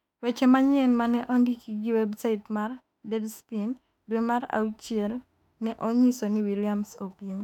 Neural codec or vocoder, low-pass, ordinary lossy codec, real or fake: autoencoder, 48 kHz, 32 numbers a frame, DAC-VAE, trained on Japanese speech; 19.8 kHz; none; fake